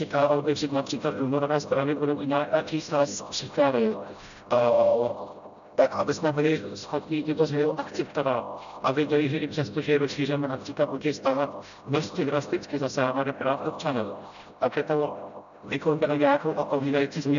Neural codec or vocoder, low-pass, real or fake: codec, 16 kHz, 0.5 kbps, FreqCodec, smaller model; 7.2 kHz; fake